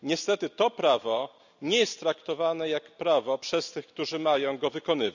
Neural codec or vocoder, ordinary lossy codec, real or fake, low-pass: none; none; real; 7.2 kHz